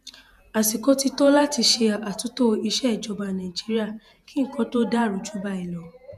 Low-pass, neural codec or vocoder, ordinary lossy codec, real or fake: 14.4 kHz; vocoder, 48 kHz, 128 mel bands, Vocos; none; fake